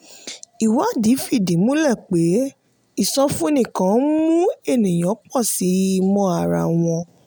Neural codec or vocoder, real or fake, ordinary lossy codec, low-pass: none; real; none; none